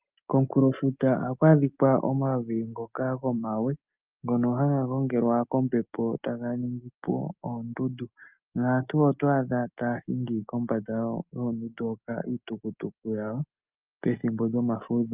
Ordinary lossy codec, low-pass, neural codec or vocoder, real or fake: Opus, 24 kbps; 3.6 kHz; none; real